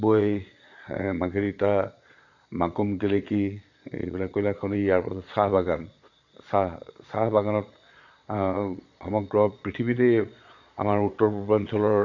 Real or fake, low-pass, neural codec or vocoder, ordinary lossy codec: fake; 7.2 kHz; vocoder, 22.05 kHz, 80 mel bands, Vocos; AAC, 48 kbps